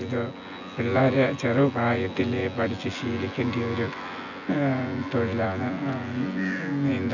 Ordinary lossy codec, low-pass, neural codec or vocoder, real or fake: none; 7.2 kHz; vocoder, 24 kHz, 100 mel bands, Vocos; fake